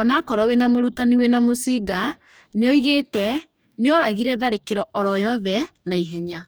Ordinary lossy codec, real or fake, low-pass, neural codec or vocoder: none; fake; none; codec, 44.1 kHz, 2.6 kbps, DAC